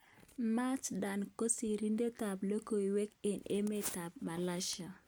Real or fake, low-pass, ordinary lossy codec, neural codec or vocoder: real; none; none; none